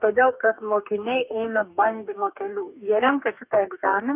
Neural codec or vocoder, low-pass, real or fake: codec, 44.1 kHz, 2.6 kbps, DAC; 3.6 kHz; fake